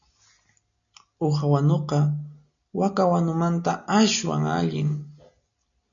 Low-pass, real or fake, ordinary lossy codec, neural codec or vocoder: 7.2 kHz; real; MP3, 96 kbps; none